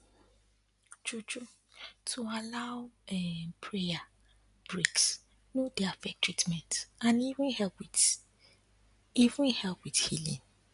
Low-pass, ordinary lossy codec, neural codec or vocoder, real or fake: 10.8 kHz; none; none; real